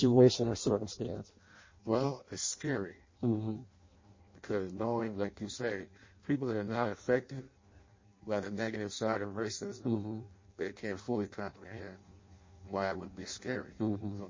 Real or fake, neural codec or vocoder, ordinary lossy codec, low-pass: fake; codec, 16 kHz in and 24 kHz out, 0.6 kbps, FireRedTTS-2 codec; MP3, 32 kbps; 7.2 kHz